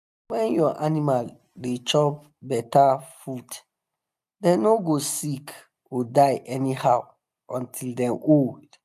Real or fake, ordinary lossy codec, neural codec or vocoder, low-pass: real; none; none; 14.4 kHz